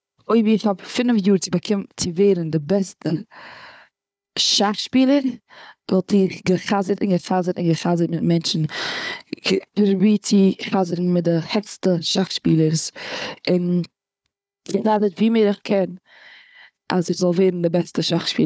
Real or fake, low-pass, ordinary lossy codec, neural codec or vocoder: fake; none; none; codec, 16 kHz, 4 kbps, FunCodec, trained on Chinese and English, 50 frames a second